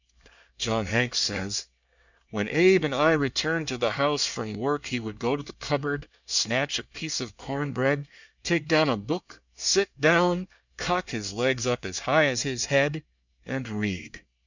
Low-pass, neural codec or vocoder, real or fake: 7.2 kHz; codec, 24 kHz, 1 kbps, SNAC; fake